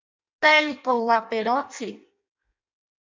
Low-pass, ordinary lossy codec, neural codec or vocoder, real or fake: 7.2 kHz; MP3, 64 kbps; codec, 16 kHz in and 24 kHz out, 0.6 kbps, FireRedTTS-2 codec; fake